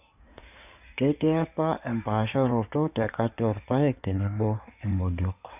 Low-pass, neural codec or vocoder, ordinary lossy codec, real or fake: 3.6 kHz; codec, 16 kHz in and 24 kHz out, 2.2 kbps, FireRedTTS-2 codec; none; fake